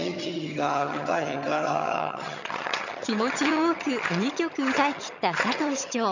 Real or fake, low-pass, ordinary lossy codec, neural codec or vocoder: fake; 7.2 kHz; none; vocoder, 22.05 kHz, 80 mel bands, HiFi-GAN